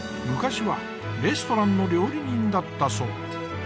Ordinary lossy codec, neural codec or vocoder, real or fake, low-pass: none; none; real; none